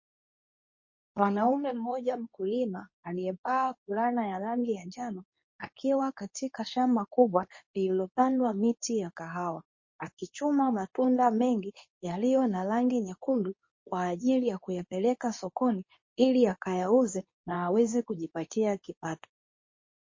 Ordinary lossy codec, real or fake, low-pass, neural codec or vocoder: MP3, 32 kbps; fake; 7.2 kHz; codec, 24 kHz, 0.9 kbps, WavTokenizer, medium speech release version 2